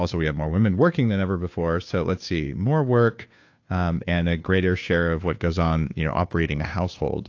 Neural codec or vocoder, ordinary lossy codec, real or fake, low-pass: codec, 16 kHz, 2 kbps, FunCodec, trained on Chinese and English, 25 frames a second; AAC, 48 kbps; fake; 7.2 kHz